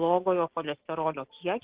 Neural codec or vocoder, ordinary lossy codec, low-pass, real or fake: none; Opus, 16 kbps; 3.6 kHz; real